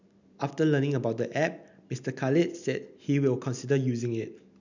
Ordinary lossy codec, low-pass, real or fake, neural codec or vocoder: none; 7.2 kHz; real; none